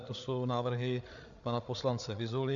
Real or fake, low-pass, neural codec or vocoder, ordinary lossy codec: fake; 7.2 kHz; codec, 16 kHz, 8 kbps, FreqCodec, larger model; MP3, 64 kbps